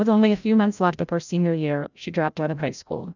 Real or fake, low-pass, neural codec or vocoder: fake; 7.2 kHz; codec, 16 kHz, 0.5 kbps, FreqCodec, larger model